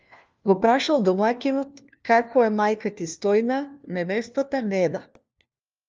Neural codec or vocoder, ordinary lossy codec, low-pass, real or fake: codec, 16 kHz, 1 kbps, FunCodec, trained on LibriTTS, 50 frames a second; Opus, 24 kbps; 7.2 kHz; fake